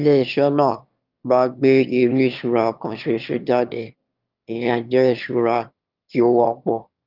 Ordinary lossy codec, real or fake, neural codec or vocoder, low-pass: Opus, 24 kbps; fake; autoencoder, 22.05 kHz, a latent of 192 numbers a frame, VITS, trained on one speaker; 5.4 kHz